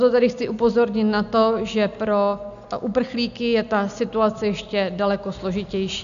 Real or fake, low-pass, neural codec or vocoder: real; 7.2 kHz; none